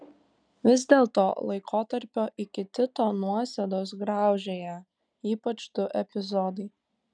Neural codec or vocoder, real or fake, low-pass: none; real; 9.9 kHz